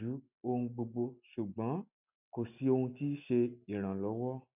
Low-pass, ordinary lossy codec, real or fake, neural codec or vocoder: 3.6 kHz; none; real; none